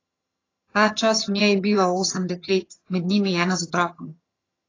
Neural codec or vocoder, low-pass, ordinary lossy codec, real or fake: vocoder, 22.05 kHz, 80 mel bands, HiFi-GAN; 7.2 kHz; AAC, 32 kbps; fake